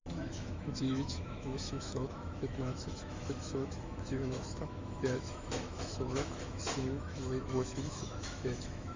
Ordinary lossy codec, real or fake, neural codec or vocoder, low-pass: MP3, 64 kbps; fake; autoencoder, 48 kHz, 128 numbers a frame, DAC-VAE, trained on Japanese speech; 7.2 kHz